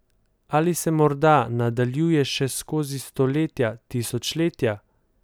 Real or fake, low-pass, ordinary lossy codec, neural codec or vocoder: real; none; none; none